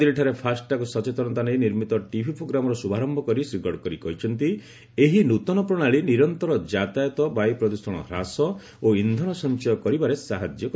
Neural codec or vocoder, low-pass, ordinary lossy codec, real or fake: none; none; none; real